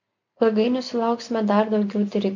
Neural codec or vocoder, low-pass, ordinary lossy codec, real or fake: none; 7.2 kHz; MP3, 64 kbps; real